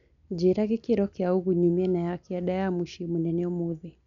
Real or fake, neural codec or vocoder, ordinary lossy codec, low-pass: real; none; none; 7.2 kHz